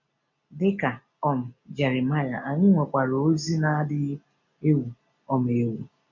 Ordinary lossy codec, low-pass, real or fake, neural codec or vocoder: none; 7.2 kHz; real; none